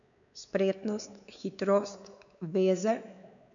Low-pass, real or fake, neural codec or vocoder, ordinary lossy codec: 7.2 kHz; fake; codec, 16 kHz, 4 kbps, X-Codec, WavLM features, trained on Multilingual LibriSpeech; none